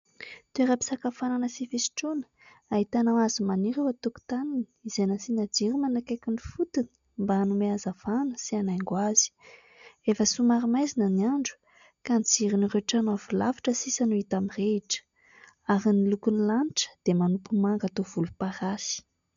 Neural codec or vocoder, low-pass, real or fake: none; 7.2 kHz; real